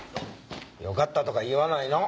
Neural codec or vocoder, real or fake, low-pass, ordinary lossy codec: none; real; none; none